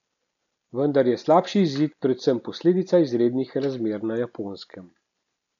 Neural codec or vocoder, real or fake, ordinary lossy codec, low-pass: none; real; none; 7.2 kHz